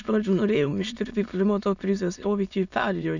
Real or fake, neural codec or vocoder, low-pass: fake; autoencoder, 22.05 kHz, a latent of 192 numbers a frame, VITS, trained on many speakers; 7.2 kHz